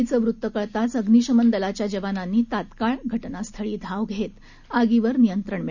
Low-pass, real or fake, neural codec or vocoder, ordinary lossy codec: 7.2 kHz; real; none; none